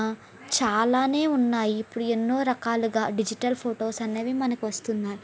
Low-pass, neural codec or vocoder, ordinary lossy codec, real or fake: none; none; none; real